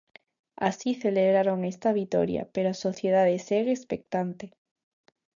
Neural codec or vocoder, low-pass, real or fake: none; 7.2 kHz; real